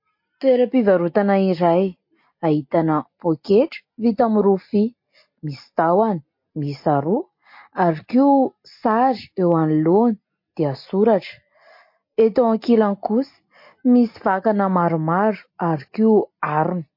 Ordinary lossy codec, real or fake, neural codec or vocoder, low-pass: MP3, 32 kbps; real; none; 5.4 kHz